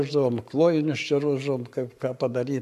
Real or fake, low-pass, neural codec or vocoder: fake; 14.4 kHz; codec, 44.1 kHz, 7.8 kbps, Pupu-Codec